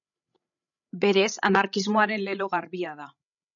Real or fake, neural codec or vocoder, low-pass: fake; codec, 16 kHz, 16 kbps, FreqCodec, larger model; 7.2 kHz